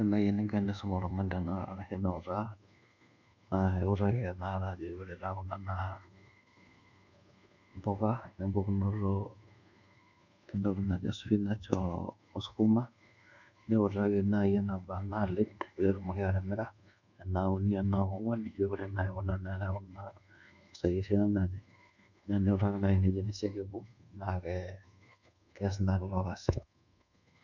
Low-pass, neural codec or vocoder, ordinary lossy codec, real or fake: 7.2 kHz; codec, 24 kHz, 1.2 kbps, DualCodec; none; fake